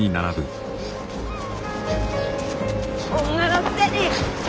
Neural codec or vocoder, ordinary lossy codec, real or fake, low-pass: none; none; real; none